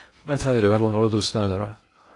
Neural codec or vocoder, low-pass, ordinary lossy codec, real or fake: codec, 16 kHz in and 24 kHz out, 0.6 kbps, FocalCodec, streaming, 2048 codes; 10.8 kHz; AAC, 48 kbps; fake